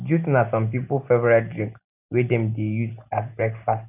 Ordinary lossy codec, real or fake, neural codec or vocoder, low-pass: none; real; none; 3.6 kHz